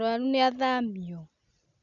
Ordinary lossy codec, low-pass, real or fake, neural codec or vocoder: none; 7.2 kHz; real; none